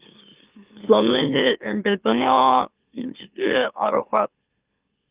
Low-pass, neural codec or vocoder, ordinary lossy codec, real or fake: 3.6 kHz; autoencoder, 44.1 kHz, a latent of 192 numbers a frame, MeloTTS; Opus, 32 kbps; fake